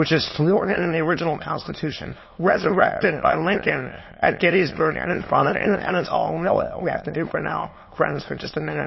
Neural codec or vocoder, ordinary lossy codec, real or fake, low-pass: autoencoder, 22.05 kHz, a latent of 192 numbers a frame, VITS, trained on many speakers; MP3, 24 kbps; fake; 7.2 kHz